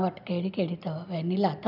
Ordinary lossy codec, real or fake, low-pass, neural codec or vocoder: none; real; 5.4 kHz; none